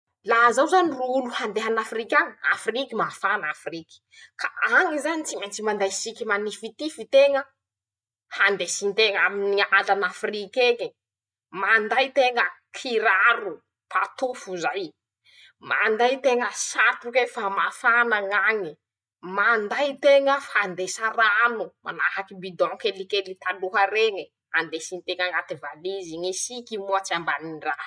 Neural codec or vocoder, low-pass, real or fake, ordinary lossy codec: none; none; real; none